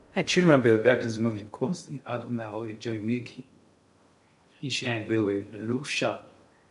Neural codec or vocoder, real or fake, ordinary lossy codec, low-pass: codec, 16 kHz in and 24 kHz out, 0.6 kbps, FocalCodec, streaming, 2048 codes; fake; MP3, 64 kbps; 10.8 kHz